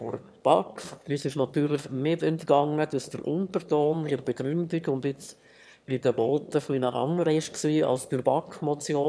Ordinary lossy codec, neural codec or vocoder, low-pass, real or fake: none; autoencoder, 22.05 kHz, a latent of 192 numbers a frame, VITS, trained on one speaker; none; fake